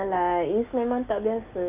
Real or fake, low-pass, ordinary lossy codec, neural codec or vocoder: real; 3.6 kHz; none; none